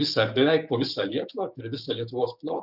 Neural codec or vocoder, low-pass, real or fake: codec, 16 kHz, 6 kbps, DAC; 5.4 kHz; fake